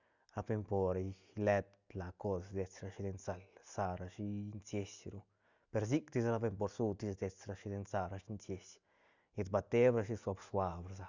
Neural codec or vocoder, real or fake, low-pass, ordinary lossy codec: none; real; 7.2 kHz; Opus, 64 kbps